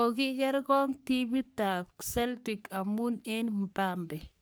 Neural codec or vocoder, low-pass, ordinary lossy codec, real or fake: codec, 44.1 kHz, 3.4 kbps, Pupu-Codec; none; none; fake